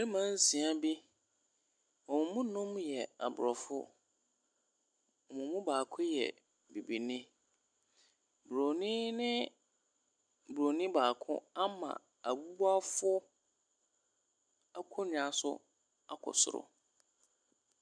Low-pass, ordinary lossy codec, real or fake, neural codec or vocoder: 9.9 kHz; MP3, 96 kbps; real; none